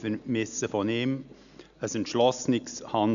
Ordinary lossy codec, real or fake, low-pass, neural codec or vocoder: none; real; 7.2 kHz; none